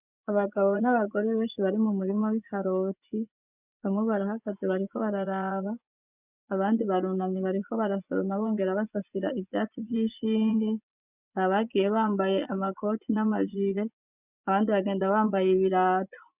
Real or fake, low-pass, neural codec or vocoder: fake; 3.6 kHz; vocoder, 24 kHz, 100 mel bands, Vocos